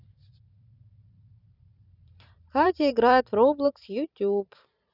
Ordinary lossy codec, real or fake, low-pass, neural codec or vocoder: none; fake; 5.4 kHz; vocoder, 44.1 kHz, 128 mel bands every 512 samples, BigVGAN v2